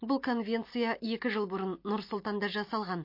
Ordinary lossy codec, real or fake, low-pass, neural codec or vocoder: MP3, 32 kbps; real; 5.4 kHz; none